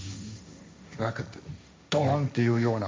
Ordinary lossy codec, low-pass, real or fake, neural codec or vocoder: none; none; fake; codec, 16 kHz, 1.1 kbps, Voila-Tokenizer